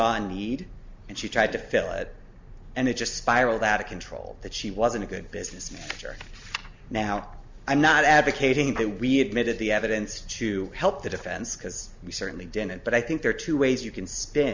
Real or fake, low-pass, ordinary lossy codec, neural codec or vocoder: real; 7.2 kHz; MP3, 64 kbps; none